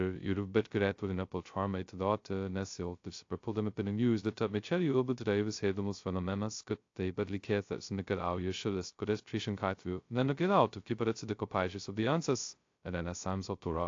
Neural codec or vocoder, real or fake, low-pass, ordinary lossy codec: codec, 16 kHz, 0.2 kbps, FocalCodec; fake; 7.2 kHz; AAC, 48 kbps